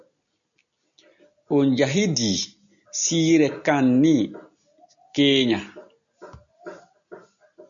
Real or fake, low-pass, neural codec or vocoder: real; 7.2 kHz; none